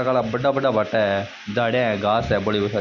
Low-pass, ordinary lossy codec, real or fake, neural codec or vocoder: 7.2 kHz; none; real; none